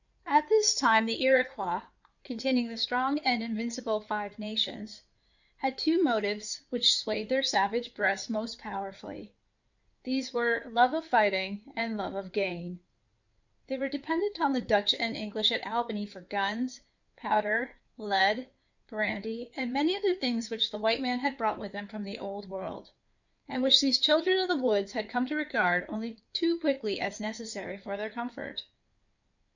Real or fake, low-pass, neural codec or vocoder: fake; 7.2 kHz; codec, 16 kHz in and 24 kHz out, 2.2 kbps, FireRedTTS-2 codec